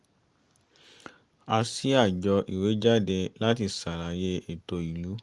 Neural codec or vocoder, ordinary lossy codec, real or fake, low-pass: none; Opus, 32 kbps; real; 10.8 kHz